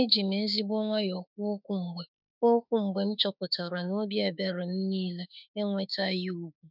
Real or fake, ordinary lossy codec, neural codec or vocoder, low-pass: fake; none; autoencoder, 48 kHz, 32 numbers a frame, DAC-VAE, trained on Japanese speech; 5.4 kHz